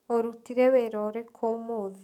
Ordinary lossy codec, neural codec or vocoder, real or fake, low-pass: none; codec, 44.1 kHz, 7.8 kbps, DAC; fake; 19.8 kHz